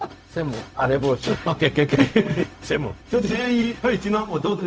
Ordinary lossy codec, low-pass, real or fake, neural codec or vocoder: none; none; fake; codec, 16 kHz, 0.4 kbps, LongCat-Audio-Codec